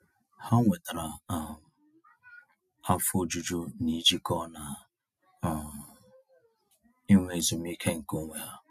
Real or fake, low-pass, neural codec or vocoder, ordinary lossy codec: real; 14.4 kHz; none; none